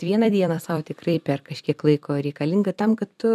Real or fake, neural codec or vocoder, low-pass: fake; vocoder, 44.1 kHz, 128 mel bands every 256 samples, BigVGAN v2; 14.4 kHz